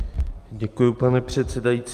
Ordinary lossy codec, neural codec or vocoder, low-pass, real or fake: Opus, 32 kbps; none; 14.4 kHz; real